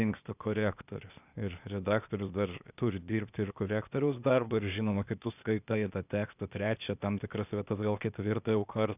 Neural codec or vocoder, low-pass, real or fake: codec, 16 kHz, 0.8 kbps, ZipCodec; 3.6 kHz; fake